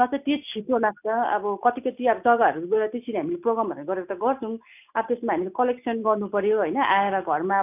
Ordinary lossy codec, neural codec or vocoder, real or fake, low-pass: none; none; real; 3.6 kHz